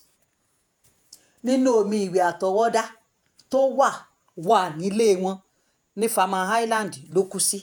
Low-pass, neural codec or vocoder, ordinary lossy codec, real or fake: none; vocoder, 48 kHz, 128 mel bands, Vocos; none; fake